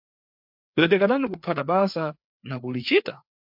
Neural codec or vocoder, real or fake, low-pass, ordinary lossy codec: codec, 16 kHz, 4 kbps, FreqCodec, larger model; fake; 5.4 kHz; MP3, 48 kbps